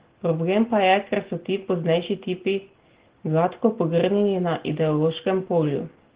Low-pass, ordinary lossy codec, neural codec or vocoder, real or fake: 3.6 kHz; Opus, 16 kbps; none; real